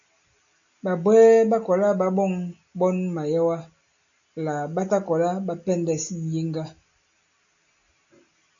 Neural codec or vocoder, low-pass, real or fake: none; 7.2 kHz; real